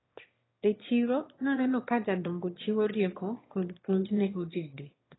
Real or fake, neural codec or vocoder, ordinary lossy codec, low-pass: fake; autoencoder, 22.05 kHz, a latent of 192 numbers a frame, VITS, trained on one speaker; AAC, 16 kbps; 7.2 kHz